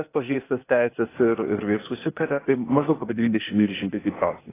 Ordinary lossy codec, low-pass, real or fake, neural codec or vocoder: AAC, 16 kbps; 3.6 kHz; fake; codec, 16 kHz, 0.8 kbps, ZipCodec